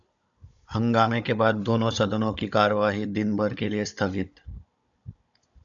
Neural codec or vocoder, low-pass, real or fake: codec, 16 kHz, 16 kbps, FunCodec, trained on Chinese and English, 50 frames a second; 7.2 kHz; fake